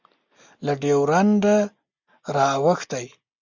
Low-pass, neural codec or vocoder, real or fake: 7.2 kHz; none; real